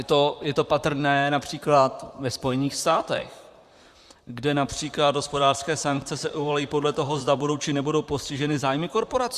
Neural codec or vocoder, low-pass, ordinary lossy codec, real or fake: vocoder, 44.1 kHz, 128 mel bands, Pupu-Vocoder; 14.4 kHz; Opus, 64 kbps; fake